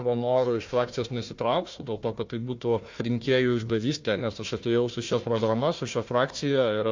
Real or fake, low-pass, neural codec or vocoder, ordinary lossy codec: fake; 7.2 kHz; codec, 16 kHz, 1 kbps, FunCodec, trained on Chinese and English, 50 frames a second; MP3, 48 kbps